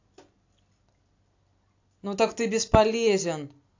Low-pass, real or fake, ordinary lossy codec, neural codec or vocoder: 7.2 kHz; real; AAC, 48 kbps; none